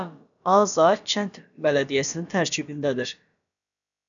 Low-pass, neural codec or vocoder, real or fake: 7.2 kHz; codec, 16 kHz, about 1 kbps, DyCAST, with the encoder's durations; fake